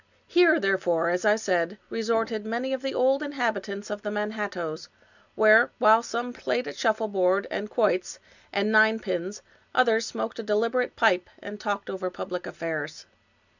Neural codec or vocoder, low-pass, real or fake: none; 7.2 kHz; real